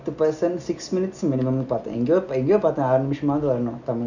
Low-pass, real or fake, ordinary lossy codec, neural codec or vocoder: 7.2 kHz; real; none; none